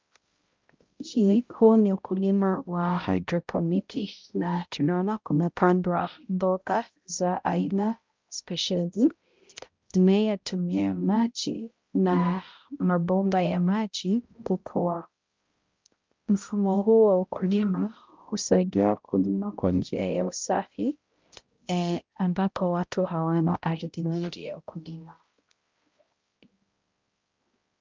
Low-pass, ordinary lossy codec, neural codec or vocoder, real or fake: 7.2 kHz; Opus, 24 kbps; codec, 16 kHz, 0.5 kbps, X-Codec, HuBERT features, trained on balanced general audio; fake